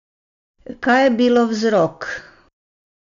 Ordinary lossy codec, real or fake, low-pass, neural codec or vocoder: none; real; 7.2 kHz; none